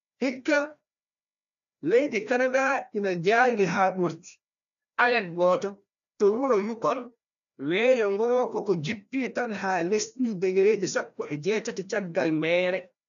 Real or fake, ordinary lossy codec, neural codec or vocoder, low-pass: fake; none; codec, 16 kHz, 1 kbps, FreqCodec, larger model; 7.2 kHz